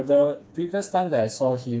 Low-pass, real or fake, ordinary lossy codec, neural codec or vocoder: none; fake; none; codec, 16 kHz, 2 kbps, FreqCodec, smaller model